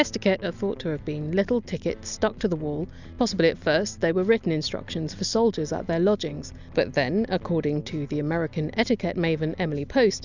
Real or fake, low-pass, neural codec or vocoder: real; 7.2 kHz; none